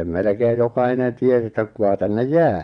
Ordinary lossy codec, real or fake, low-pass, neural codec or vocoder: none; fake; 9.9 kHz; vocoder, 22.05 kHz, 80 mel bands, WaveNeXt